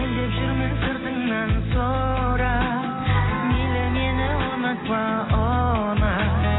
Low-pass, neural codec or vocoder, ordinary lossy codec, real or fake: 7.2 kHz; none; AAC, 16 kbps; real